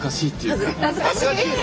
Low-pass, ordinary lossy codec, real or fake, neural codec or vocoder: none; none; real; none